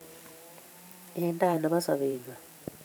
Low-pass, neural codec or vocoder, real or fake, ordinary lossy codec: none; vocoder, 44.1 kHz, 128 mel bands every 512 samples, BigVGAN v2; fake; none